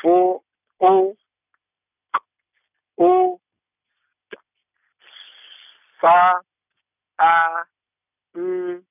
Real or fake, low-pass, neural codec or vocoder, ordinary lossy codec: real; 3.6 kHz; none; none